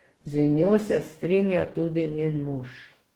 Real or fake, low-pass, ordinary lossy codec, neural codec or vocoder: fake; 19.8 kHz; Opus, 16 kbps; codec, 44.1 kHz, 2.6 kbps, DAC